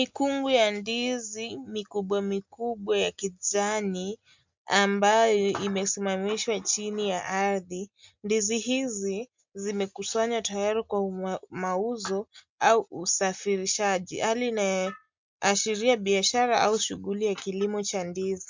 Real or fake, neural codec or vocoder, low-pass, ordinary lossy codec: real; none; 7.2 kHz; MP3, 64 kbps